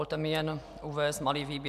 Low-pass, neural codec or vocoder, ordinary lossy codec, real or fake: 14.4 kHz; none; AAC, 96 kbps; real